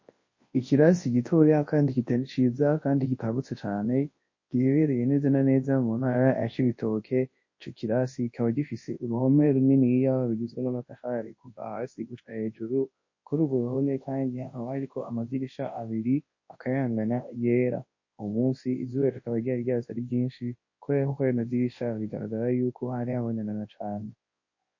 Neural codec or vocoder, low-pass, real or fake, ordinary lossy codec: codec, 24 kHz, 0.9 kbps, WavTokenizer, large speech release; 7.2 kHz; fake; MP3, 32 kbps